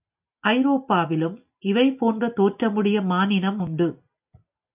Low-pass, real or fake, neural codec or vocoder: 3.6 kHz; real; none